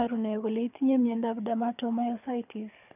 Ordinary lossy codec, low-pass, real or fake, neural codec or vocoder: none; 3.6 kHz; fake; codec, 24 kHz, 6 kbps, HILCodec